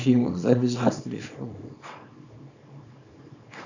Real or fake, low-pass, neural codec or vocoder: fake; 7.2 kHz; codec, 24 kHz, 0.9 kbps, WavTokenizer, small release